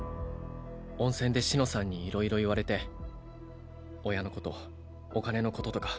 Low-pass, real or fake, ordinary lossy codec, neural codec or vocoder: none; real; none; none